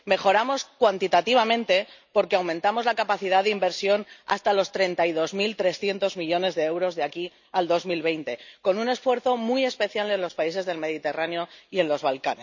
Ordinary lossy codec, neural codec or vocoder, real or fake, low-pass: none; none; real; 7.2 kHz